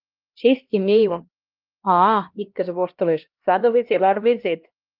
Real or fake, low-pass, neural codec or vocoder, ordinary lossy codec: fake; 5.4 kHz; codec, 16 kHz, 1 kbps, X-Codec, HuBERT features, trained on LibriSpeech; Opus, 32 kbps